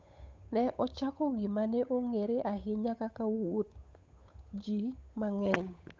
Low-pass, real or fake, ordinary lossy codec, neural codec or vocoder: none; fake; none; codec, 16 kHz, 8 kbps, FunCodec, trained on Chinese and English, 25 frames a second